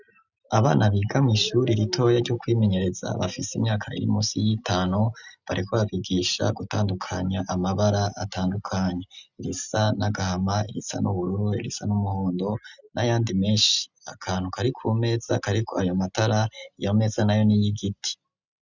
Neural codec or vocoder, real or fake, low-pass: none; real; 7.2 kHz